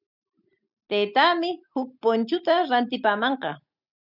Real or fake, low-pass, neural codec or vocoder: real; 5.4 kHz; none